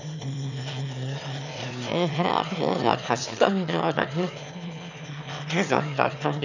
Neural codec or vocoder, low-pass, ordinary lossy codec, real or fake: autoencoder, 22.05 kHz, a latent of 192 numbers a frame, VITS, trained on one speaker; 7.2 kHz; none; fake